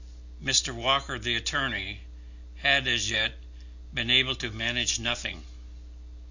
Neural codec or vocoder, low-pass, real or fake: none; 7.2 kHz; real